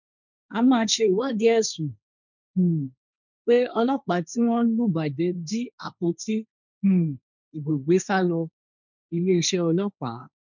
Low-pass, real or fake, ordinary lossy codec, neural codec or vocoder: 7.2 kHz; fake; none; codec, 16 kHz, 1.1 kbps, Voila-Tokenizer